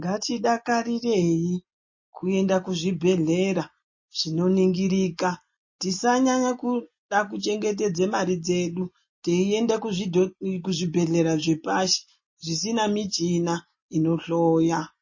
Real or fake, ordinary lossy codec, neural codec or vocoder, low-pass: real; MP3, 32 kbps; none; 7.2 kHz